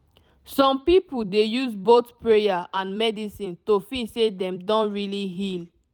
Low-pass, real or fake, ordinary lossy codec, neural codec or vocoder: 19.8 kHz; fake; none; vocoder, 44.1 kHz, 128 mel bands every 256 samples, BigVGAN v2